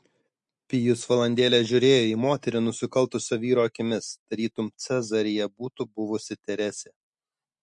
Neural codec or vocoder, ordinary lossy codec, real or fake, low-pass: none; MP3, 48 kbps; real; 10.8 kHz